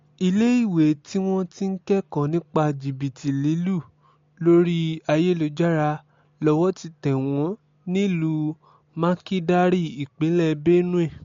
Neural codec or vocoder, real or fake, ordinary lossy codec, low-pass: none; real; MP3, 48 kbps; 7.2 kHz